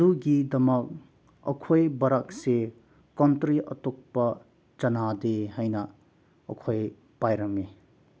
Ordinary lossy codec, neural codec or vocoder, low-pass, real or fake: none; none; none; real